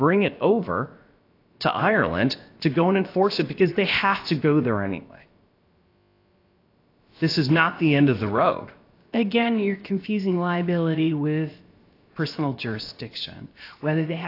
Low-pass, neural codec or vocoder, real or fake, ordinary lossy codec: 5.4 kHz; codec, 16 kHz, about 1 kbps, DyCAST, with the encoder's durations; fake; AAC, 32 kbps